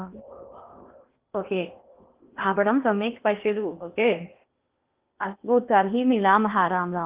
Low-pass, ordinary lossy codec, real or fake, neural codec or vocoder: 3.6 kHz; Opus, 32 kbps; fake; codec, 16 kHz in and 24 kHz out, 0.8 kbps, FocalCodec, streaming, 65536 codes